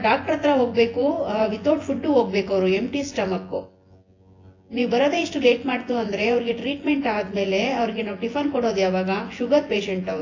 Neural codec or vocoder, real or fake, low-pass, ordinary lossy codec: vocoder, 24 kHz, 100 mel bands, Vocos; fake; 7.2 kHz; AAC, 32 kbps